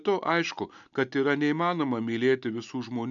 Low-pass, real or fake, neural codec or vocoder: 7.2 kHz; real; none